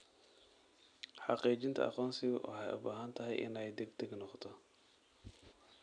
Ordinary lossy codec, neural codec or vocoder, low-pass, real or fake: none; none; 9.9 kHz; real